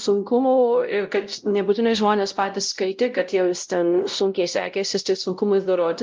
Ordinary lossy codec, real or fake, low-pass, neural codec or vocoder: Opus, 24 kbps; fake; 7.2 kHz; codec, 16 kHz, 0.5 kbps, X-Codec, WavLM features, trained on Multilingual LibriSpeech